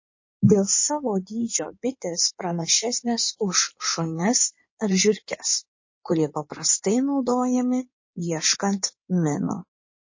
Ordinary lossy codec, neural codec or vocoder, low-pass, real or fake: MP3, 32 kbps; codec, 16 kHz in and 24 kHz out, 2.2 kbps, FireRedTTS-2 codec; 7.2 kHz; fake